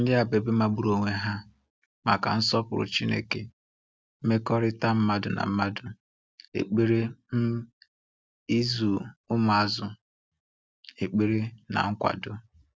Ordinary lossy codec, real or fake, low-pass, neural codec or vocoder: none; real; none; none